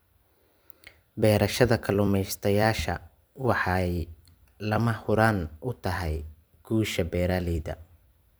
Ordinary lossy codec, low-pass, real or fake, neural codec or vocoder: none; none; fake; vocoder, 44.1 kHz, 128 mel bands every 256 samples, BigVGAN v2